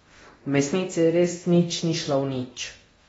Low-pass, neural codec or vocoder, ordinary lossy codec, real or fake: 10.8 kHz; codec, 24 kHz, 0.9 kbps, DualCodec; AAC, 24 kbps; fake